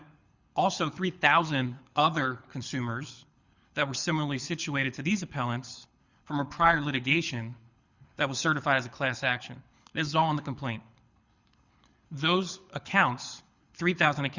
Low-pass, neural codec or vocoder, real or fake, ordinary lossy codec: 7.2 kHz; codec, 24 kHz, 6 kbps, HILCodec; fake; Opus, 64 kbps